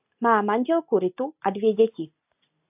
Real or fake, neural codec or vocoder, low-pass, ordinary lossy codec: real; none; 3.6 kHz; AAC, 32 kbps